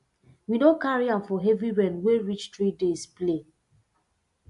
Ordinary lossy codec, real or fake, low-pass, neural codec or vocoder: AAC, 64 kbps; real; 10.8 kHz; none